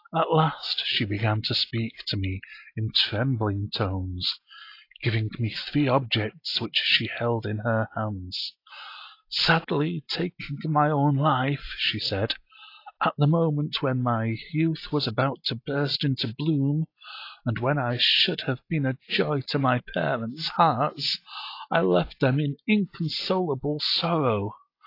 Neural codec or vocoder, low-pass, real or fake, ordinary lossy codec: none; 5.4 kHz; real; AAC, 32 kbps